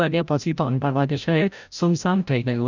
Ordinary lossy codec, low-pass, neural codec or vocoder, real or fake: none; 7.2 kHz; codec, 16 kHz, 0.5 kbps, FreqCodec, larger model; fake